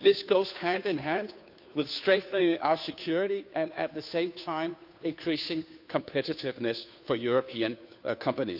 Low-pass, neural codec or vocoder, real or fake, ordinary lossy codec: 5.4 kHz; codec, 16 kHz, 2 kbps, FunCodec, trained on Chinese and English, 25 frames a second; fake; AAC, 48 kbps